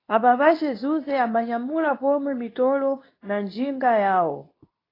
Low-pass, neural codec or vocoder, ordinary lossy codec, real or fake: 5.4 kHz; codec, 24 kHz, 0.9 kbps, WavTokenizer, medium speech release version 2; AAC, 24 kbps; fake